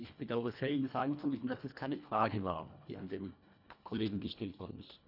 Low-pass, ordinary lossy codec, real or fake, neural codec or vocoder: 5.4 kHz; none; fake; codec, 24 kHz, 1.5 kbps, HILCodec